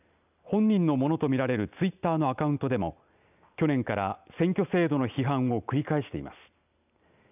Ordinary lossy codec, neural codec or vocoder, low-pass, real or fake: none; none; 3.6 kHz; real